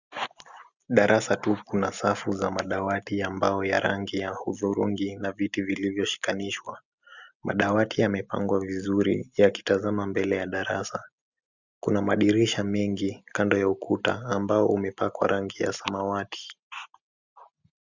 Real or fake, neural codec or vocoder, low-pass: real; none; 7.2 kHz